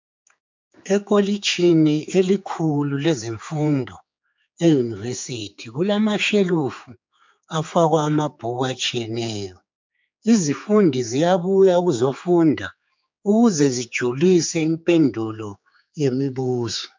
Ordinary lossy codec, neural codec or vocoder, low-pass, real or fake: MP3, 64 kbps; codec, 16 kHz, 4 kbps, X-Codec, HuBERT features, trained on general audio; 7.2 kHz; fake